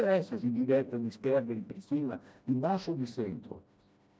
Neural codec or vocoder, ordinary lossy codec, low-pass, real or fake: codec, 16 kHz, 1 kbps, FreqCodec, smaller model; none; none; fake